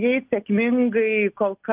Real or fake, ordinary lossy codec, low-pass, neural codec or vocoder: real; Opus, 32 kbps; 3.6 kHz; none